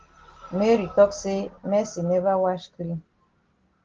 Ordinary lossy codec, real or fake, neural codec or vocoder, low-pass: Opus, 16 kbps; real; none; 7.2 kHz